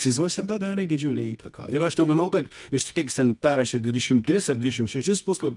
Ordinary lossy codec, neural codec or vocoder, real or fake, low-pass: MP3, 96 kbps; codec, 24 kHz, 0.9 kbps, WavTokenizer, medium music audio release; fake; 10.8 kHz